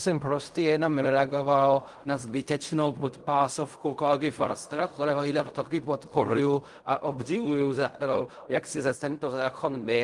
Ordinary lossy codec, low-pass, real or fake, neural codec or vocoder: Opus, 24 kbps; 10.8 kHz; fake; codec, 16 kHz in and 24 kHz out, 0.4 kbps, LongCat-Audio-Codec, fine tuned four codebook decoder